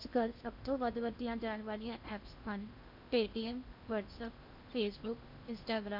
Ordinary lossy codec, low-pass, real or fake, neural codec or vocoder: MP3, 48 kbps; 5.4 kHz; fake; codec, 16 kHz in and 24 kHz out, 0.8 kbps, FocalCodec, streaming, 65536 codes